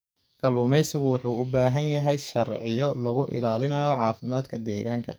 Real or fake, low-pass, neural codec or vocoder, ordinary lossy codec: fake; none; codec, 44.1 kHz, 2.6 kbps, SNAC; none